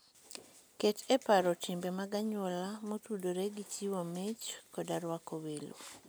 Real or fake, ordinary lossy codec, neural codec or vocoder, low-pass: real; none; none; none